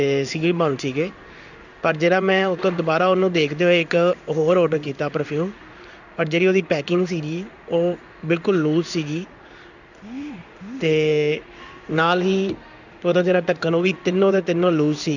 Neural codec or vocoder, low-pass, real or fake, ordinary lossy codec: codec, 16 kHz in and 24 kHz out, 1 kbps, XY-Tokenizer; 7.2 kHz; fake; none